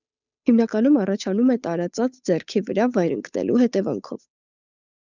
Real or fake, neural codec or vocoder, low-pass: fake; codec, 16 kHz, 8 kbps, FunCodec, trained on Chinese and English, 25 frames a second; 7.2 kHz